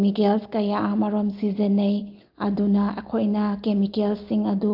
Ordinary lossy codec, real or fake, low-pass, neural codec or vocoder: Opus, 32 kbps; real; 5.4 kHz; none